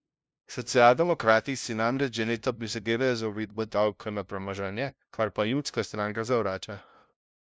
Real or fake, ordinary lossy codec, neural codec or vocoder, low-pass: fake; none; codec, 16 kHz, 0.5 kbps, FunCodec, trained on LibriTTS, 25 frames a second; none